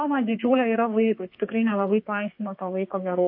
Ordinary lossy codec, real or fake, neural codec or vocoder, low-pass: MP3, 32 kbps; fake; codec, 32 kHz, 1.9 kbps, SNAC; 5.4 kHz